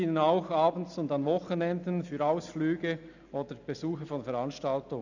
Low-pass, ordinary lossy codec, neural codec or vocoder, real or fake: 7.2 kHz; none; none; real